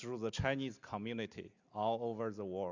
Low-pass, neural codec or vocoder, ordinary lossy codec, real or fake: 7.2 kHz; none; Opus, 64 kbps; real